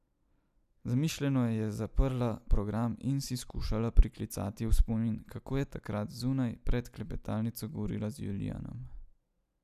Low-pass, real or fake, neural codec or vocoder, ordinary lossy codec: 14.4 kHz; real; none; none